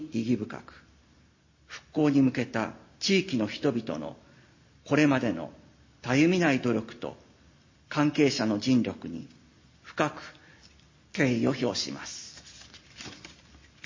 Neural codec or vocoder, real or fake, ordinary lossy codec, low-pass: none; real; MP3, 32 kbps; 7.2 kHz